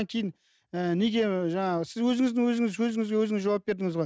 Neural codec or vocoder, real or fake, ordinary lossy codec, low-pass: none; real; none; none